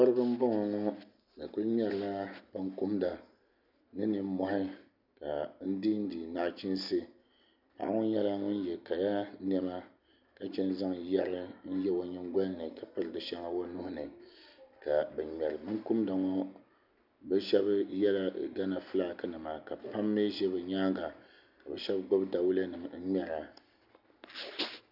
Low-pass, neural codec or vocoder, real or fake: 5.4 kHz; none; real